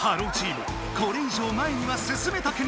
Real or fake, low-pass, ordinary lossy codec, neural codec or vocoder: real; none; none; none